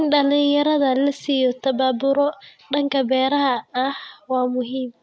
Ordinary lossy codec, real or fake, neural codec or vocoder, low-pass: none; real; none; none